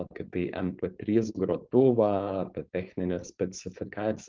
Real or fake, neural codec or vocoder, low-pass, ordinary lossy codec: fake; codec, 16 kHz, 4.8 kbps, FACodec; 7.2 kHz; Opus, 32 kbps